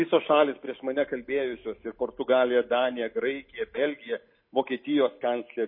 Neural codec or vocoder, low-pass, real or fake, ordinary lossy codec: none; 5.4 kHz; real; MP3, 24 kbps